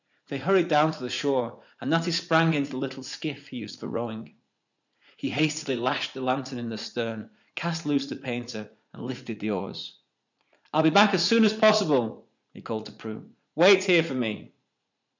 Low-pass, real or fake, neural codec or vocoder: 7.2 kHz; fake; vocoder, 44.1 kHz, 80 mel bands, Vocos